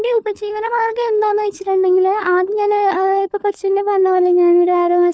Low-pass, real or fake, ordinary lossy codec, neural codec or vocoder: none; fake; none; codec, 16 kHz, 4 kbps, FunCodec, trained on LibriTTS, 50 frames a second